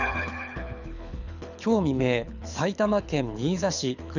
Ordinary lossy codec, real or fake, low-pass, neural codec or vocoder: none; fake; 7.2 kHz; codec, 24 kHz, 6 kbps, HILCodec